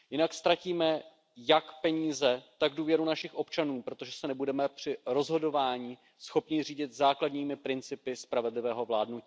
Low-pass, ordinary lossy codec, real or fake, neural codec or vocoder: none; none; real; none